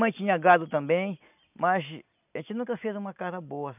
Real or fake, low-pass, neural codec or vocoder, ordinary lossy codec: real; 3.6 kHz; none; none